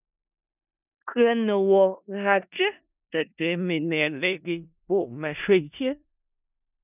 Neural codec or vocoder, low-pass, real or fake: codec, 16 kHz in and 24 kHz out, 0.4 kbps, LongCat-Audio-Codec, four codebook decoder; 3.6 kHz; fake